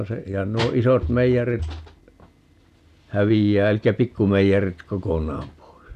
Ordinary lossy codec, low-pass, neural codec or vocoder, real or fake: none; 14.4 kHz; none; real